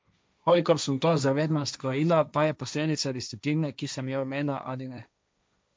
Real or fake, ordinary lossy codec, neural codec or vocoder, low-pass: fake; none; codec, 16 kHz, 1.1 kbps, Voila-Tokenizer; none